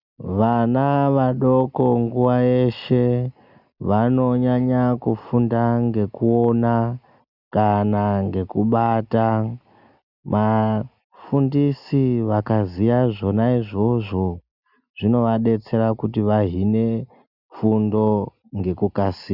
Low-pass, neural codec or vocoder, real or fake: 5.4 kHz; none; real